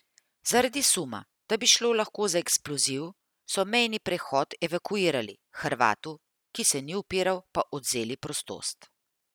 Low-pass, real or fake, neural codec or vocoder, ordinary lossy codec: none; real; none; none